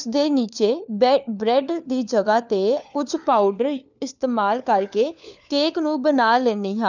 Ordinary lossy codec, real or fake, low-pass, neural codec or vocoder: none; fake; 7.2 kHz; codec, 16 kHz, 4 kbps, FunCodec, trained on LibriTTS, 50 frames a second